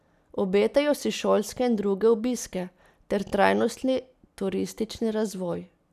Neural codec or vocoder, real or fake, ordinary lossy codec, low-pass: none; real; none; 14.4 kHz